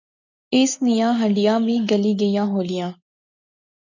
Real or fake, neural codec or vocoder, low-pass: real; none; 7.2 kHz